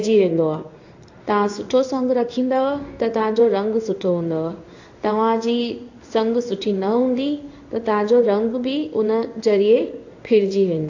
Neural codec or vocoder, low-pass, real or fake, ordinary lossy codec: codec, 16 kHz in and 24 kHz out, 1 kbps, XY-Tokenizer; 7.2 kHz; fake; AAC, 48 kbps